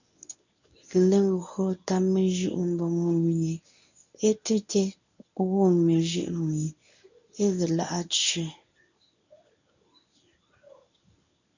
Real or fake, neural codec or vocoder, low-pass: fake; codec, 24 kHz, 0.9 kbps, WavTokenizer, medium speech release version 1; 7.2 kHz